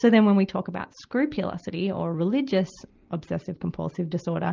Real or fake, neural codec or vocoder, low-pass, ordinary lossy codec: real; none; 7.2 kHz; Opus, 24 kbps